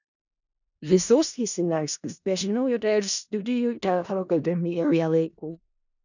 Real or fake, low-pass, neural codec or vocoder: fake; 7.2 kHz; codec, 16 kHz in and 24 kHz out, 0.4 kbps, LongCat-Audio-Codec, four codebook decoder